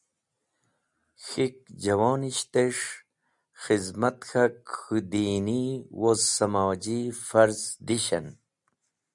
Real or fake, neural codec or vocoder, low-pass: real; none; 10.8 kHz